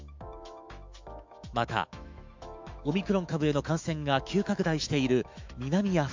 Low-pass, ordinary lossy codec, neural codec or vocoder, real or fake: 7.2 kHz; MP3, 64 kbps; autoencoder, 48 kHz, 128 numbers a frame, DAC-VAE, trained on Japanese speech; fake